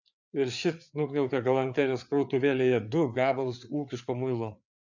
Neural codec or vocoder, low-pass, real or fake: codec, 16 kHz, 4 kbps, FreqCodec, larger model; 7.2 kHz; fake